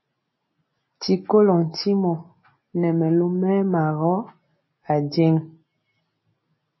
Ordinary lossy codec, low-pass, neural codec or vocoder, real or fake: MP3, 24 kbps; 7.2 kHz; none; real